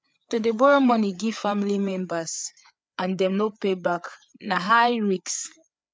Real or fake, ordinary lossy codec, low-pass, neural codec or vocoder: fake; none; none; codec, 16 kHz, 4 kbps, FreqCodec, larger model